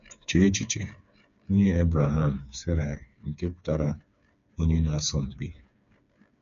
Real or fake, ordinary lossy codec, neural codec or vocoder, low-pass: fake; none; codec, 16 kHz, 4 kbps, FreqCodec, smaller model; 7.2 kHz